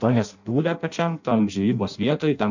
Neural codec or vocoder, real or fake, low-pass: codec, 16 kHz in and 24 kHz out, 0.6 kbps, FireRedTTS-2 codec; fake; 7.2 kHz